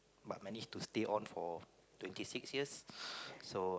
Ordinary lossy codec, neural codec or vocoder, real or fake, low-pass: none; none; real; none